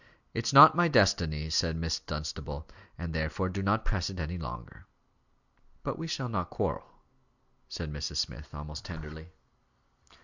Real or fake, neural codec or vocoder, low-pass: real; none; 7.2 kHz